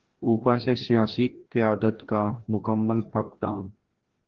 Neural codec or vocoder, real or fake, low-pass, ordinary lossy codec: codec, 16 kHz, 1 kbps, FreqCodec, larger model; fake; 7.2 kHz; Opus, 16 kbps